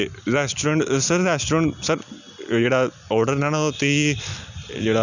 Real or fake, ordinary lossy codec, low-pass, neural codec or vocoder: real; none; 7.2 kHz; none